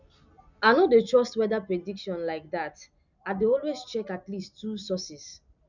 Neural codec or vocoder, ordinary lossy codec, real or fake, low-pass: none; none; real; 7.2 kHz